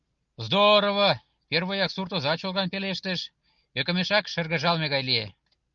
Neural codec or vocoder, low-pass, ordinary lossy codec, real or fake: none; 7.2 kHz; Opus, 32 kbps; real